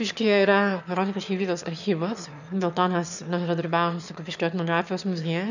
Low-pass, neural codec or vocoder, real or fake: 7.2 kHz; autoencoder, 22.05 kHz, a latent of 192 numbers a frame, VITS, trained on one speaker; fake